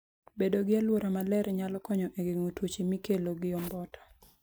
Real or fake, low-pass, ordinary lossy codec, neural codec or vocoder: real; none; none; none